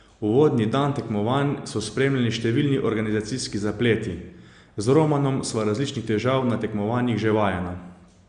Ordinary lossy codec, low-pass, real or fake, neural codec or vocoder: Opus, 64 kbps; 9.9 kHz; real; none